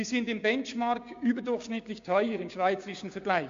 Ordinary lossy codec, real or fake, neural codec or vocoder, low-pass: MP3, 48 kbps; fake; codec, 16 kHz, 6 kbps, DAC; 7.2 kHz